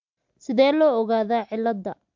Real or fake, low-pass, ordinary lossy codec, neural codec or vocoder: real; 7.2 kHz; MP3, 64 kbps; none